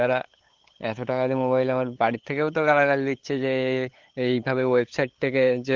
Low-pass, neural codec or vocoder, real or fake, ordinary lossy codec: 7.2 kHz; codec, 16 kHz, 8 kbps, FunCodec, trained on LibriTTS, 25 frames a second; fake; Opus, 16 kbps